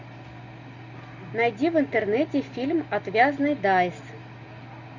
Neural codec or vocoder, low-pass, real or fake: none; 7.2 kHz; real